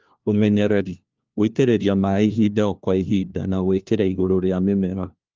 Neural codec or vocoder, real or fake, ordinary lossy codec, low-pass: codec, 16 kHz, 1 kbps, FunCodec, trained on LibriTTS, 50 frames a second; fake; Opus, 24 kbps; 7.2 kHz